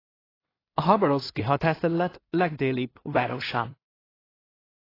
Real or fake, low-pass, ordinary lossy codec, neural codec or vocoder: fake; 5.4 kHz; AAC, 24 kbps; codec, 16 kHz in and 24 kHz out, 0.4 kbps, LongCat-Audio-Codec, two codebook decoder